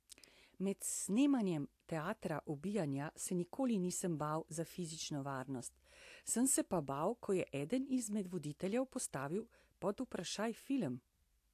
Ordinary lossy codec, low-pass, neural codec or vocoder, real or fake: AAC, 64 kbps; 14.4 kHz; none; real